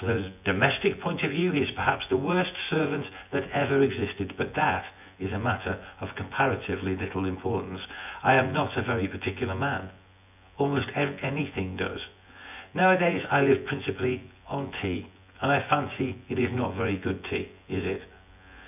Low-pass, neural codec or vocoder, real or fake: 3.6 kHz; vocoder, 24 kHz, 100 mel bands, Vocos; fake